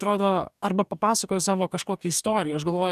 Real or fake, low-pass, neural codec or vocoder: fake; 14.4 kHz; codec, 44.1 kHz, 2.6 kbps, DAC